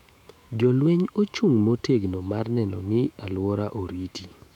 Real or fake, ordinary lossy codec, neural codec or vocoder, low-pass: fake; none; autoencoder, 48 kHz, 128 numbers a frame, DAC-VAE, trained on Japanese speech; 19.8 kHz